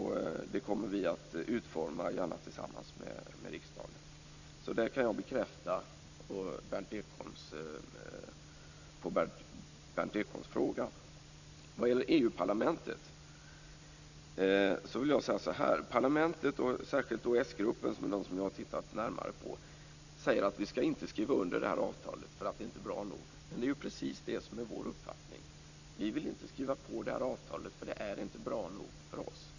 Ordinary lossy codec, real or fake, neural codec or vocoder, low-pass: none; real; none; 7.2 kHz